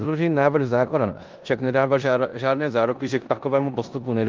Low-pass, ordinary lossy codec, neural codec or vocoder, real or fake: 7.2 kHz; Opus, 32 kbps; codec, 16 kHz in and 24 kHz out, 0.9 kbps, LongCat-Audio-Codec, four codebook decoder; fake